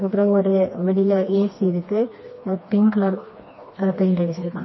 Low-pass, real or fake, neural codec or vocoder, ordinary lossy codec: 7.2 kHz; fake; codec, 16 kHz, 2 kbps, FreqCodec, smaller model; MP3, 24 kbps